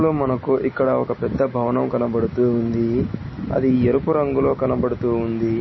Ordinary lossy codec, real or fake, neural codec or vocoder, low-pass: MP3, 24 kbps; real; none; 7.2 kHz